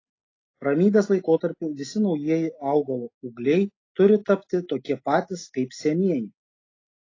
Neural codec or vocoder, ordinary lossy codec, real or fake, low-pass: none; AAC, 32 kbps; real; 7.2 kHz